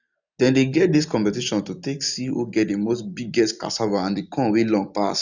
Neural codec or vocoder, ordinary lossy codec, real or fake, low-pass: none; none; real; 7.2 kHz